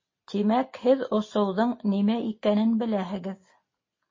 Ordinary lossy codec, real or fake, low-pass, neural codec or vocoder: MP3, 32 kbps; real; 7.2 kHz; none